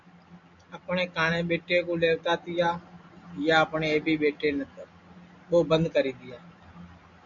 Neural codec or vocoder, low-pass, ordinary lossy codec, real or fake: none; 7.2 kHz; MP3, 64 kbps; real